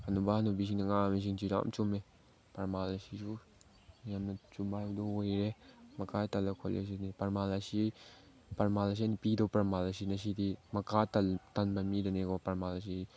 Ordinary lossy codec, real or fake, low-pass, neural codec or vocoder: none; real; none; none